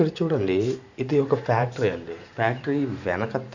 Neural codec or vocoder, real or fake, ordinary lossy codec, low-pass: vocoder, 44.1 kHz, 128 mel bands every 512 samples, BigVGAN v2; fake; none; 7.2 kHz